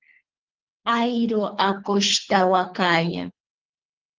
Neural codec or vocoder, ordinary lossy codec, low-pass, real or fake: codec, 24 kHz, 3 kbps, HILCodec; Opus, 32 kbps; 7.2 kHz; fake